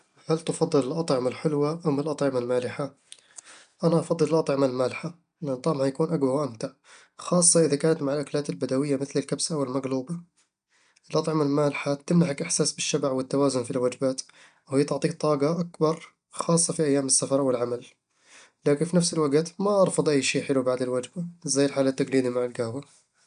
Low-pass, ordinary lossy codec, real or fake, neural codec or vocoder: 9.9 kHz; none; real; none